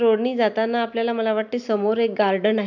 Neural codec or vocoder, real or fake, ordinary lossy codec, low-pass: none; real; none; 7.2 kHz